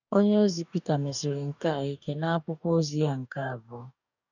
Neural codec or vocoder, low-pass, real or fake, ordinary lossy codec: codec, 44.1 kHz, 2.6 kbps, DAC; 7.2 kHz; fake; none